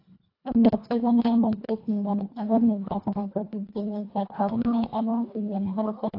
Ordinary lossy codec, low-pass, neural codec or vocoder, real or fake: AAC, 32 kbps; 5.4 kHz; codec, 24 kHz, 1.5 kbps, HILCodec; fake